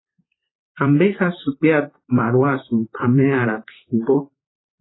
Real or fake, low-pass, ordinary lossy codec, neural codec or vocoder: fake; 7.2 kHz; AAC, 16 kbps; vocoder, 44.1 kHz, 128 mel bands, Pupu-Vocoder